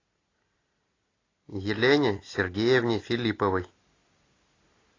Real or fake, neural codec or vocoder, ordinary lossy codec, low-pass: real; none; AAC, 32 kbps; 7.2 kHz